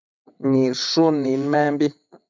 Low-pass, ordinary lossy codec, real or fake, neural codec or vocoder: 7.2 kHz; MP3, 64 kbps; fake; vocoder, 22.05 kHz, 80 mel bands, WaveNeXt